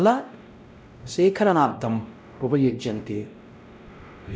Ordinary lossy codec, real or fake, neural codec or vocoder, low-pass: none; fake; codec, 16 kHz, 0.5 kbps, X-Codec, WavLM features, trained on Multilingual LibriSpeech; none